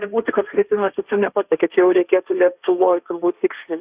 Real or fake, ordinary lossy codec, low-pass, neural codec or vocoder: fake; Opus, 64 kbps; 3.6 kHz; codec, 16 kHz, 1.1 kbps, Voila-Tokenizer